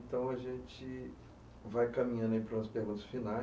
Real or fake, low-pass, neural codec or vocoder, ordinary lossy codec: real; none; none; none